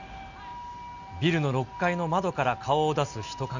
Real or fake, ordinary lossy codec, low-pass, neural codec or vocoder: real; none; 7.2 kHz; none